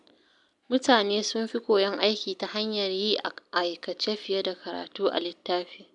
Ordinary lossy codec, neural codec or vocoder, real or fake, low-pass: none; none; real; 10.8 kHz